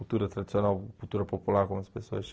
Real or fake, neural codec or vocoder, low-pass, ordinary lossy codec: real; none; none; none